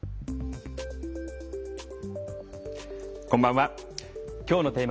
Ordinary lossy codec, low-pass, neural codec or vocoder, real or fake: none; none; none; real